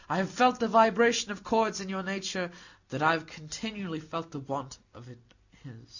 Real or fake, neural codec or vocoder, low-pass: real; none; 7.2 kHz